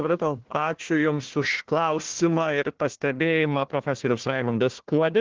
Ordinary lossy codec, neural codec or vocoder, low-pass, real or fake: Opus, 16 kbps; codec, 16 kHz, 1 kbps, FunCodec, trained on Chinese and English, 50 frames a second; 7.2 kHz; fake